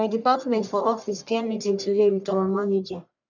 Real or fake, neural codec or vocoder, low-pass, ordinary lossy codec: fake; codec, 44.1 kHz, 1.7 kbps, Pupu-Codec; 7.2 kHz; none